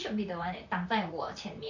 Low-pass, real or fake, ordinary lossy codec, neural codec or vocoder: 7.2 kHz; fake; none; vocoder, 44.1 kHz, 128 mel bands, Pupu-Vocoder